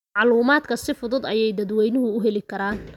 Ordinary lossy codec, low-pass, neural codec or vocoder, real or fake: none; 19.8 kHz; none; real